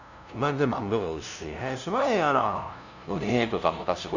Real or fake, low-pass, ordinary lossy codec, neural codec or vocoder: fake; 7.2 kHz; none; codec, 16 kHz, 0.5 kbps, FunCodec, trained on LibriTTS, 25 frames a second